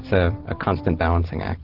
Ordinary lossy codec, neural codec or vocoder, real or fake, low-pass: Opus, 16 kbps; none; real; 5.4 kHz